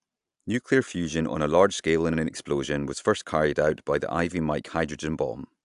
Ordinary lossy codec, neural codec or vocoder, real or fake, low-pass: AAC, 96 kbps; none; real; 10.8 kHz